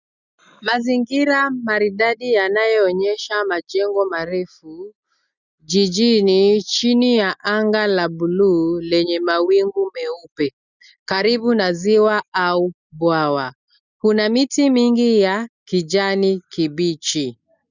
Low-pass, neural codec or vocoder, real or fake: 7.2 kHz; none; real